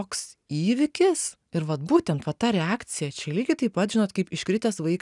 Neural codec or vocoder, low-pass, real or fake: none; 10.8 kHz; real